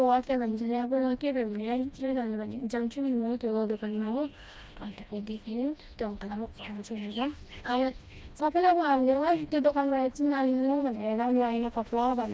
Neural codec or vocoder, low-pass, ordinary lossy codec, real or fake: codec, 16 kHz, 1 kbps, FreqCodec, smaller model; none; none; fake